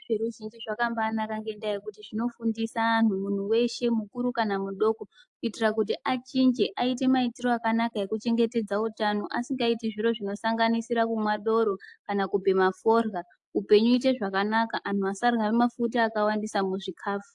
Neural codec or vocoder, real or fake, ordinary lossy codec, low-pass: none; real; AAC, 64 kbps; 10.8 kHz